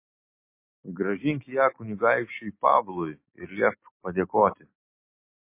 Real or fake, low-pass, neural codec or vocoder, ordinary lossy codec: real; 3.6 kHz; none; MP3, 24 kbps